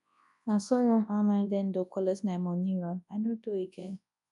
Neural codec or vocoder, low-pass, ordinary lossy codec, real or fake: codec, 24 kHz, 0.9 kbps, WavTokenizer, large speech release; 10.8 kHz; none; fake